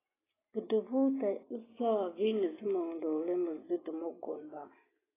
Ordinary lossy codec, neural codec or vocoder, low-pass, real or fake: AAC, 16 kbps; none; 3.6 kHz; real